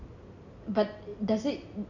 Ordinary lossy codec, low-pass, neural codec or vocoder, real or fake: none; 7.2 kHz; none; real